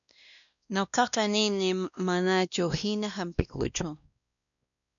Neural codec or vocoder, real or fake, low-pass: codec, 16 kHz, 1 kbps, X-Codec, WavLM features, trained on Multilingual LibriSpeech; fake; 7.2 kHz